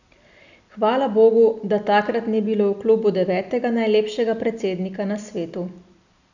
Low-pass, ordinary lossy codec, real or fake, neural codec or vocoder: 7.2 kHz; none; real; none